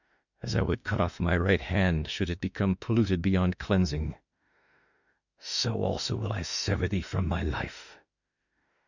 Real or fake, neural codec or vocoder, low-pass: fake; autoencoder, 48 kHz, 32 numbers a frame, DAC-VAE, trained on Japanese speech; 7.2 kHz